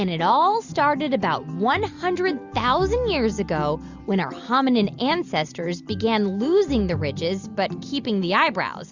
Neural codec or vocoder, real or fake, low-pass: none; real; 7.2 kHz